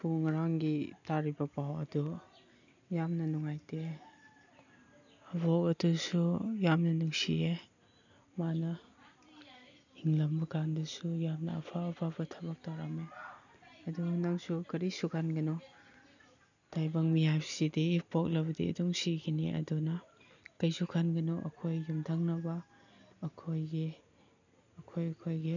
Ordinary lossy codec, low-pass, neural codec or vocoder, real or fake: none; 7.2 kHz; none; real